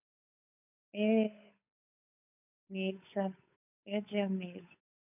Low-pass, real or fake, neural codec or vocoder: 3.6 kHz; fake; codec, 16 kHz, 8 kbps, FunCodec, trained on Chinese and English, 25 frames a second